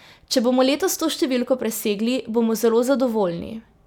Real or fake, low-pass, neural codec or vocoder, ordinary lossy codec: real; 19.8 kHz; none; none